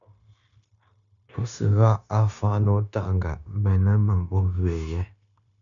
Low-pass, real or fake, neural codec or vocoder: 7.2 kHz; fake; codec, 16 kHz, 0.9 kbps, LongCat-Audio-Codec